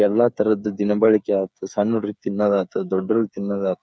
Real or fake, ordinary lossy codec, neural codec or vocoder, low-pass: fake; none; codec, 16 kHz, 8 kbps, FreqCodec, smaller model; none